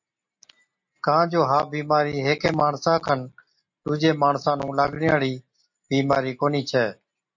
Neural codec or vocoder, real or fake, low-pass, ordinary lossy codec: none; real; 7.2 kHz; MP3, 48 kbps